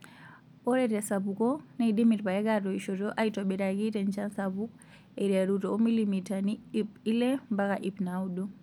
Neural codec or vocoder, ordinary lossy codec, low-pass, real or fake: none; none; 19.8 kHz; real